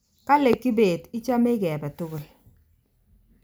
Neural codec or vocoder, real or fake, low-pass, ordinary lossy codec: none; real; none; none